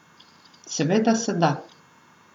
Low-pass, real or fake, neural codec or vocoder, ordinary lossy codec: 19.8 kHz; real; none; none